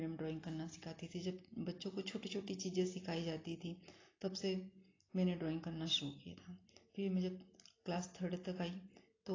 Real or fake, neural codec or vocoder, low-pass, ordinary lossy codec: real; none; 7.2 kHz; AAC, 32 kbps